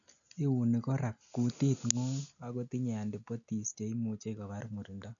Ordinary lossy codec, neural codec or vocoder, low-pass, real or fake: none; none; 7.2 kHz; real